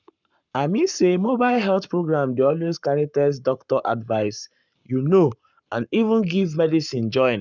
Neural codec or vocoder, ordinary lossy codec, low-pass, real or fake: codec, 44.1 kHz, 7.8 kbps, Pupu-Codec; none; 7.2 kHz; fake